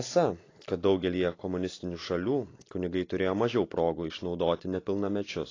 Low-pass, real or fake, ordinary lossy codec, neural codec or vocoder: 7.2 kHz; real; AAC, 32 kbps; none